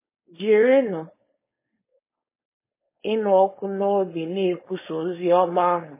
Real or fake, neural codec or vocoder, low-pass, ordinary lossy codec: fake; codec, 16 kHz, 4.8 kbps, FACodec; 3.6 kHz; MP3, 16 kbps